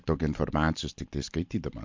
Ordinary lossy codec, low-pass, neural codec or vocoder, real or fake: MP3, 48 kbps; 7.2 kHz; none; real